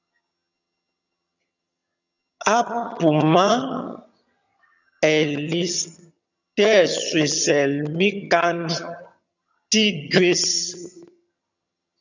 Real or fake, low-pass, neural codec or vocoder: fake; 7.2 kHz; vocoder, 22.05 kHz, 80 mel bands, HiFi-GAN